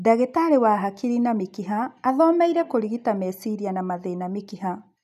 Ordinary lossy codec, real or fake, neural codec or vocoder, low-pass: none; real; none; 14.4 kHz